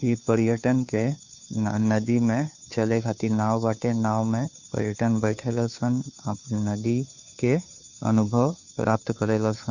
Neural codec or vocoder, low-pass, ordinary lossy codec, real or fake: codec, 16 kHz, 2 kbps, FunCodec, trained on Chinese and English, 25 frames a second; 7.2 kHz; none; fake